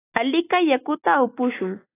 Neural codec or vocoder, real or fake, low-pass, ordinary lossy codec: none; real; 3.6 kHz; AAC, 16 kbps